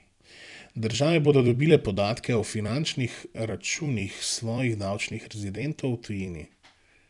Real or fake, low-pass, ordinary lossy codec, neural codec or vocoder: fake; 10.8 kHz; none; vocoder, 44.1 kHz, 128 mel bands every 256 samples, BigVGAN v2